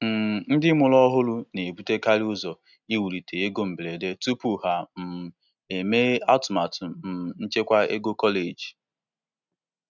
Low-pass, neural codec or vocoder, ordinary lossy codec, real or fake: 7.2 kHz; none; none; real